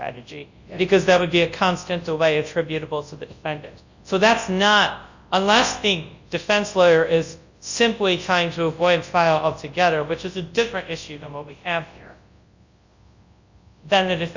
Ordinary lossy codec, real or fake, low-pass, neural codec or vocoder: Opus, 64 kbps; fake; 7.2 kHz; codec, 24 kHz, 0.9 kbps, WavTokenizer, large speech release